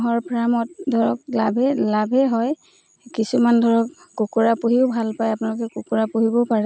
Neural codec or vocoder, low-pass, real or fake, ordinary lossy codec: none; none; real; none